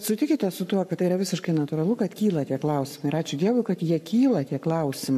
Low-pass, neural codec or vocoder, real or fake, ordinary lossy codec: 14.4 kHz; codec, 44.1 kHz, 7.8 kbps, Pupu-Codec; fake; AAC, 96 kbps